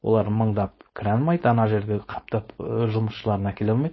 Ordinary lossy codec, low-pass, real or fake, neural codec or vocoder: MP3, 24 kbps; 7.2 kHz; fake; codec, 16 kHz, 4.8 kbps, FACodec